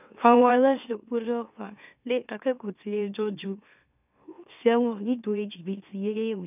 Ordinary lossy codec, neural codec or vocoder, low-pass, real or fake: none; autoencoder, 44.1 kHz, a latent of 192 numbers a frame, MeloTTS; 3.6 kHz; fake